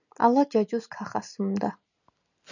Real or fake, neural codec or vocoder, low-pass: real; none; 7.2 kHz